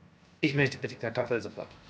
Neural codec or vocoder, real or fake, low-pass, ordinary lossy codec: codec, 16 kHz, 0.7 kbps, FocalCodec; fake; none; none